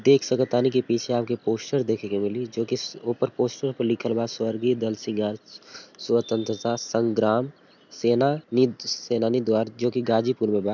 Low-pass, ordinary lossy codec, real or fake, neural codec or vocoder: 7.2 kHz; none; real; none